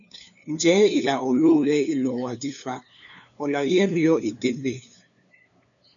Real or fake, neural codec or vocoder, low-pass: fake; codec, 16 kHz, 2 kbps, FunCodec, trained on LibriTTS, 25 frames a second; 7.2 kHz